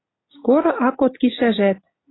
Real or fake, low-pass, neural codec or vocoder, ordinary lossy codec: real; 7.2 kHz; none; AAC, 16 kbps